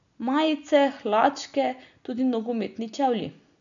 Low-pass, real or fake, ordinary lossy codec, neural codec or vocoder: 7.2 kHz; real; none; none